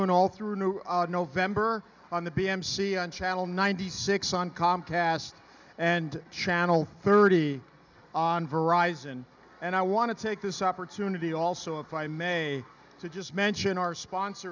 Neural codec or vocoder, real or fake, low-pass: none; real; 7.2 kHz